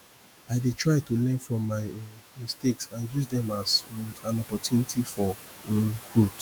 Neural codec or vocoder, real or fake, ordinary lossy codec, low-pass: autoencoder, 48 kHz, 128 numbers a frame, DAC-VAE, trained on Japanese speech; fake; none; none